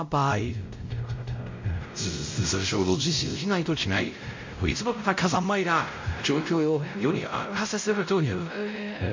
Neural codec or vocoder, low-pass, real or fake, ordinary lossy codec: codec, 16 kHz, 0.5 kbps, X-Codec, WavLM features, trained on Multilingual LibriSpeech; 7.2 kHz; fake; MP3, 48 kbps